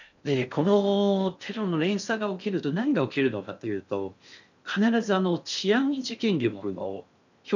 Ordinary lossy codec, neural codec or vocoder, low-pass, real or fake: none; codec, 16 kHz in and 24 kHz out, 0.6 kbps, FocalCodec, streaming, 4096 codes; 7.2 kHz; fake